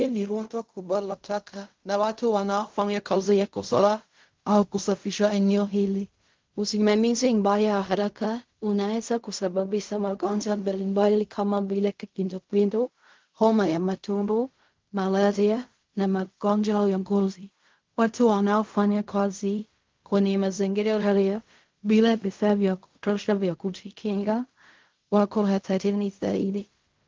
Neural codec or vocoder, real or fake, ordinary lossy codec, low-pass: codec, 16 kHz in and 24 kHz out, 0.4 kbps, LongCat-Audio-Codec, fine tuned four codebook decoder; fake; Opus, 32 kbps; 7.2 kHz